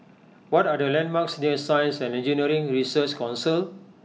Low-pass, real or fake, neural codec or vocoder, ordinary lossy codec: none; real; none; none